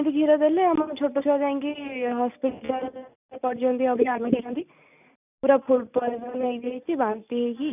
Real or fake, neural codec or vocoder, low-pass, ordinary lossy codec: real; none; 3.6 kHz; none